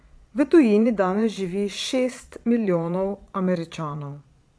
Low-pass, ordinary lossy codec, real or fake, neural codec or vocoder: none; none; fake; vocoder, 22.05 kHz, 80 mel bands, Vocos